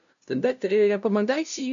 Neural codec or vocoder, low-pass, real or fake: codec, 16 kHz, 0.5 kbps, FunCodec, trained on LibriTTS, 25 frames a second; 7.2 kHz; fake